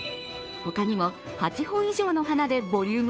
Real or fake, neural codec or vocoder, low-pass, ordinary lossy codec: fake; codec, 16 kHz, 2 kbps, FunCodec, trained on Chinese and English, 25 frames a second; none; none